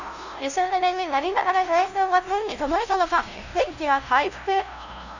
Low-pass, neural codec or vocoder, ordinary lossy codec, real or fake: 7.2 kHz; codec, 16 kHz, 0.5 kbps, FunCodec, trained on LibriTTS, 25 frames a second; none; fake